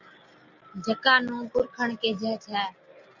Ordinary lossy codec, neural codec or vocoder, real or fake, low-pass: Opus, 64 kbps; none; real; 7.2 kHz